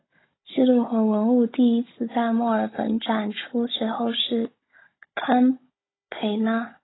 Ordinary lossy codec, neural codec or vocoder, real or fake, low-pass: AAC, 16 kbps; codec, 16 kHz, 16 kbps, FunCodec, trained on Chinese and English, 50 frames a second; fake; 7.2 kHz